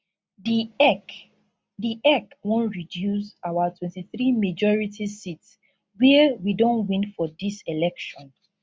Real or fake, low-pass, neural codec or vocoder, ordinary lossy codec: real; none; none; none